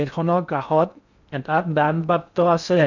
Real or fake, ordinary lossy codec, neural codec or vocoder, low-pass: fake; none; codec, 16 kHz in and 24 kHz out, 0.6 kbps, FocalCodec, streaming, 2048 codes; 7.2 kHz